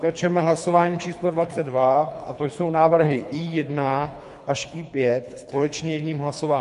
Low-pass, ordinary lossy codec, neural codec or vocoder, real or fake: 10.8 kHz; MP3, 64 kbps; codec, 24 kHz, 3 kbps, HILCodec; fake